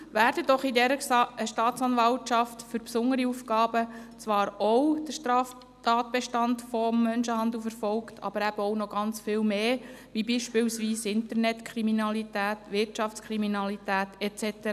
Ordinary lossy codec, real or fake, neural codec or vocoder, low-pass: none; real; none; 14.4 kHz